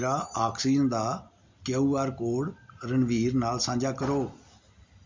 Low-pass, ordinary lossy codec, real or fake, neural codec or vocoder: 7.2 kHz; MP3, 64 kbps; real; none